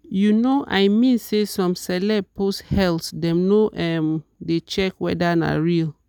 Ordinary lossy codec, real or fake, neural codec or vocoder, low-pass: none; real; none; 19.8 kHz